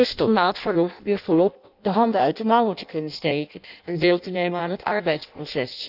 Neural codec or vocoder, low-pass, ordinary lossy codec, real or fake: codec, 16 kHz in and 24 kHz out, 0.6 kbps, FireRedTTS-2 codec; 5.4 kHz; none; fake